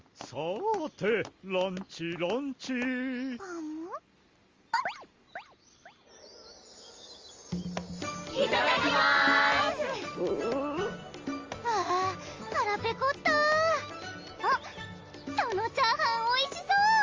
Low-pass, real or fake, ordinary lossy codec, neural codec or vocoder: 7.2 kHz; real; Opus, 32 kbps; none